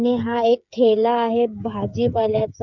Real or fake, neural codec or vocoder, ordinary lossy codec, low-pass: fake; codec, 16 kHz, 8 kbps, FreqCodec, smaller model; none; 7.2 kHz